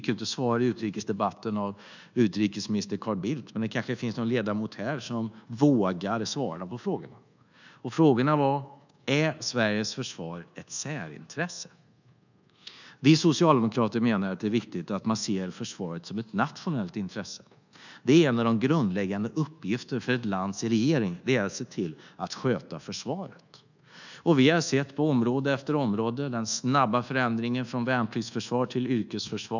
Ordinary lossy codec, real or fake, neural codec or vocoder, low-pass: none; fake; codec, 24 kHz, 1.2 kbps, DualCodec; 7.2 kHz